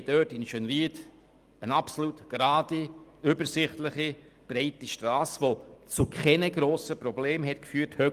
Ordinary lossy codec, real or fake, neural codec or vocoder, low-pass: Opus, 32 kbps; real; none; 14.4 kHz